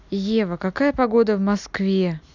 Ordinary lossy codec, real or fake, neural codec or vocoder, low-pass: none; real; none; 7.2 kHz